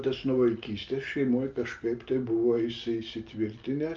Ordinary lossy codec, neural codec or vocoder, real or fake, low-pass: Opus, 32 kbps; none; real; 7.2 kHz